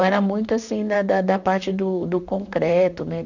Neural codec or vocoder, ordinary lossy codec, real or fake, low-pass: vocoder, 44.1 kHz, 128 mel bands, Pupu-Vocoder; none; fake; 7.2 kHz